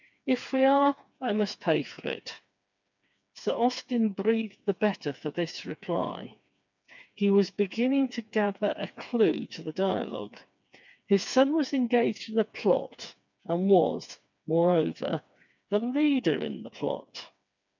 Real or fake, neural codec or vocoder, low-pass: fake; codec, 16 kHz, 4 kbps, FreqCodec, smaller model; 7.2 kHz